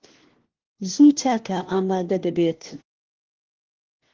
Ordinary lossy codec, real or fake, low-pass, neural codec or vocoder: Opus, 16 kbps; fake; 7.2 kHz; codec, 16 kHz, 1 kbps, FunCodec, trained on LibriTTS, 50 frames a second